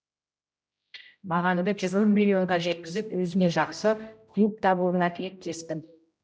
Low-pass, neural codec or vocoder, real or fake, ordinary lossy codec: none; codec, 16 kHz, 0.5 kbps, X-Codec, HuBERT features, trained on general audio; fake; none